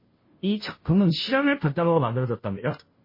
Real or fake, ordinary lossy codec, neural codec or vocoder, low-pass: fake; MP3, 24 kbps; codec, 16 kHz, 0.5 kbps, FunCodec, trained on Chinese and English, 25 frames a second; 5.4 kHz